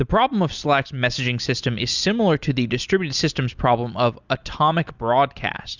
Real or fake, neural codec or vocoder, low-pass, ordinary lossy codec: real; none; 7.2 kHz; Opus, 64 kbps